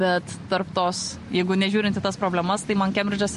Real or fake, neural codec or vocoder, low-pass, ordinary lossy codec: real; none; 14.4 kHz; MP3, 48 kbps